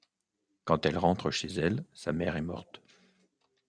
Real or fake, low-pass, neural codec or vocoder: real; 9.9 kHz; none